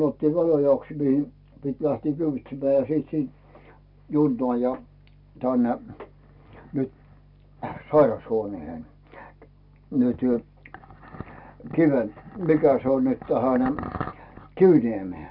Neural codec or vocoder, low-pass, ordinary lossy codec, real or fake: none; 5.4 kHz; none; real